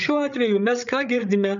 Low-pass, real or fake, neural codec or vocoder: 7.2 kHz; fake; codec, 16 kHz, 8 kbps, FreqCodec, larger model